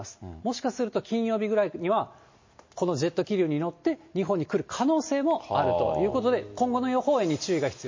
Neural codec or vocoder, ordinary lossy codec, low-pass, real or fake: none; MP3, 32 kbps; 7.2 kHz; real